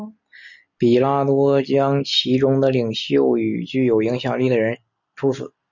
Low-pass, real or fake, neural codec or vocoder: 7.2 kHz; real; none